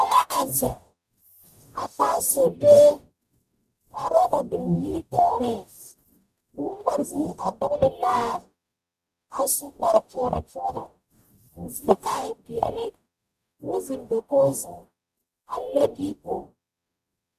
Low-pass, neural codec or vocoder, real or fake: 14.4 kHz; codec, 44.1 kHz, 0.9 kbps, DAC; fake